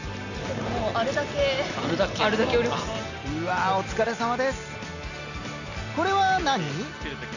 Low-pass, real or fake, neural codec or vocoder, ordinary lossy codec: 7.2 kHz; real; none; none